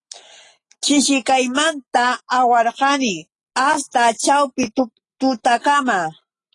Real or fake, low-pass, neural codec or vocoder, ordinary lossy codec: real; 10.8 kHz; none; AAC, 32 kbps